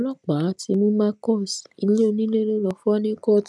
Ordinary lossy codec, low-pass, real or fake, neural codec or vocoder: none; none; real; none